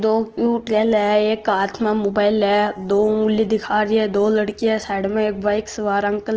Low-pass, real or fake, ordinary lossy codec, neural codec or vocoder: 7.2 kHz; real; Opus, 16 kbps; none